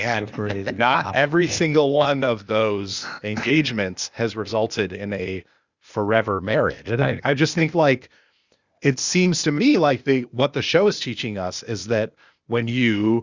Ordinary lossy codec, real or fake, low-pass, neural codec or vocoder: Opus, 64 kbps; fake; 7.2 kHz; codec, 16 kHz, 0.8 kbps, ZipCodec